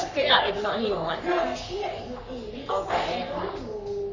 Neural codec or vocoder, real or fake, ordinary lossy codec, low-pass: codec, 44.1 kHz, 3.4 kbps, Pupu-Codec; fake; Opus, 64 kbps; 7.2 kHz